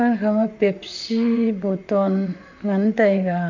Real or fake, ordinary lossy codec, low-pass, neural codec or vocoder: fake; none; 7.2 kHz; vocoder, 44.1 kHz, 128 mel bands, Pupu-Vocoder